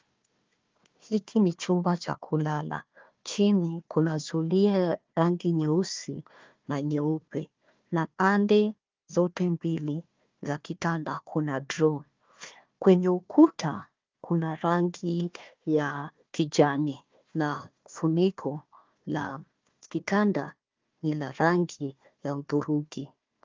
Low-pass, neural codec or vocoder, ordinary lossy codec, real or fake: 7.2 kHz; codec, 16 kHz, 1 kbps, FunCodec, trained on Chinese and English, 50 frames a second; Opus, 32 kbps; fake